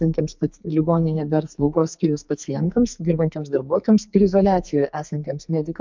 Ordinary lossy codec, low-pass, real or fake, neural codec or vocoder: MP3, 64 kbps; 7.2 kHz; fake; codec, 44.1 kHz, 2.6 kbps, SNAC